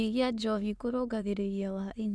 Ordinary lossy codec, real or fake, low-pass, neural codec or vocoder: none; fake; none; autoencoder, 22.05 kHz, a latent of 192 numbers a frame, VITS, trained on many speakers